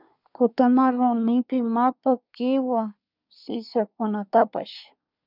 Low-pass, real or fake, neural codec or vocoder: 5.4 kHz; fake; codec, 24 kHz, 1 kbps, SNAC